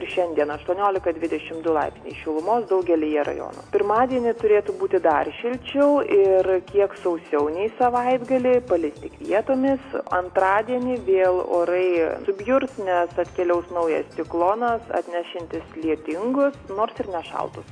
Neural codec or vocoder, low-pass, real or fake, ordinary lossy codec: none; 9.9 kHz; real; AAC, 48 kbps